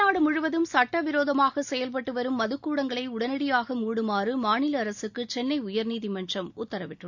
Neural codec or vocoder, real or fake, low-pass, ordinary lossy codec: none; real; 7.2 kHz; none